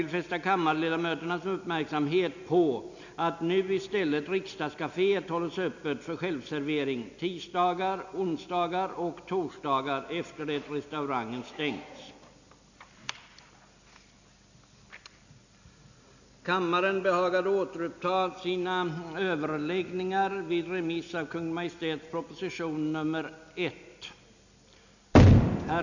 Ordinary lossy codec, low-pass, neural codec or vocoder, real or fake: none; 7.2 kHz; none; real